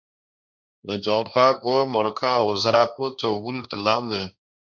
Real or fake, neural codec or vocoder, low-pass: fake; codec, 16 kHz, 1.1 kbps, Voila-Tokenizer; 7.2 kHz